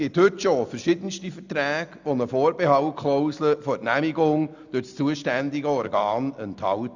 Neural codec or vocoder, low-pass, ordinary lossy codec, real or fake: none; 7.2 kHz; none; real